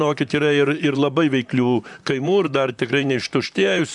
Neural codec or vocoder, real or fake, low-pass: vocoder, 44.1 kHz, 128 mel bands, Pupu-Vocoder; fake; 10.8 kHz